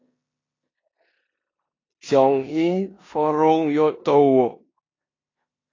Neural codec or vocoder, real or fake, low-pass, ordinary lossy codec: codec, 16 kHz in and 24 kHz out, 0.9 kbps, LongCat-Audio-Codec, four codebook decoder; fake; 7.2 kHz; AAC, 32 kbps